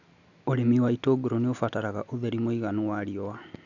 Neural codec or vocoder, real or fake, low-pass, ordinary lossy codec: none; real; 7.2 kHz; none